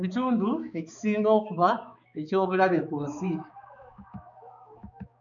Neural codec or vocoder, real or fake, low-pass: codec, 16 kHz, 4 kbps, X-Codec, HuBERT features, trained on balanced general audio; fake; 7.2 kHz